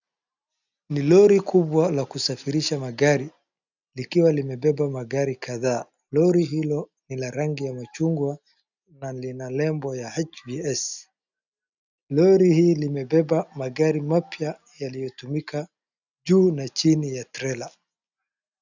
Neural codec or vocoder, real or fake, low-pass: none; real; 7.2 kHz